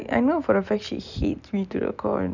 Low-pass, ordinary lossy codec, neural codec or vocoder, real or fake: 7.2 kHz; none; none; real